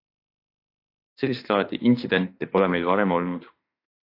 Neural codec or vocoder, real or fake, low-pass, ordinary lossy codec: autoencoder, 48 kHz, 32 numbers a frame, DAC-VAE, trained on Japanese speech; fake; 5.4 kHz; AAC, 32 kbps